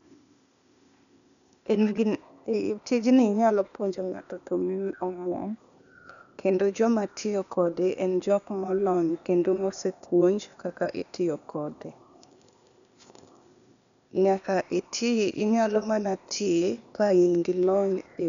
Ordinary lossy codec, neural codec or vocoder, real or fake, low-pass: none; codec, 16 kHz, 0.8 kbps, ZipCodec; fake; 7.2 kHz